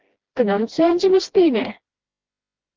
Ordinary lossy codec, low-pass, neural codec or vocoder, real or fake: Opus, 16 kbps; 7.2 kHz; codec, 16 kHz, 1 kbps, FreqCodec, smaller model; fake